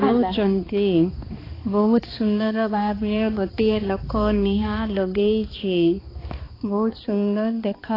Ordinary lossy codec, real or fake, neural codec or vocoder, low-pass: AAC, 24 kbps; fake; codec, 16 kHz, 2 kbps, X-Codec, HuBERT features, trained on balanced general audio; 5.4 kHz